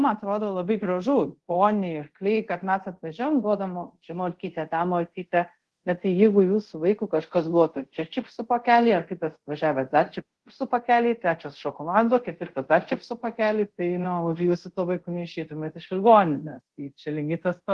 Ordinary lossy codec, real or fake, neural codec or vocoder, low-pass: Opus, 16 kbps; fake; codec, 24 kHz, 0.5 kbps, DualCodec; 10.8 kHz